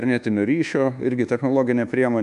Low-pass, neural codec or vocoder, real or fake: 10.8 kHz; codec, 24 kHz, 1.2 kbps, DualCodec; fake